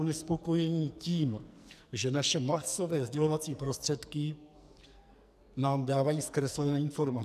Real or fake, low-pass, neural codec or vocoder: fake; 14.4 kHz; codec, 44.1 kHz, 2.6 kbps, SNAC